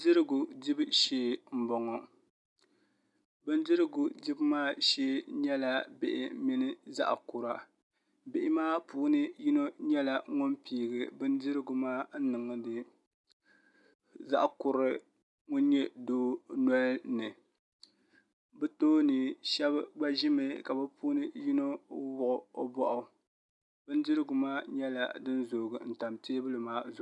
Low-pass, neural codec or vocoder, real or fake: 10.8 kHz; none; real